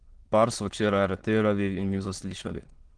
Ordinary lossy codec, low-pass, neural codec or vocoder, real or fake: Opus, 16 kbps; 9.9 kHz; autoencoder, 22.05 kHz, a latent of 192 numbers a frame, VITS, trained on many speakers; fake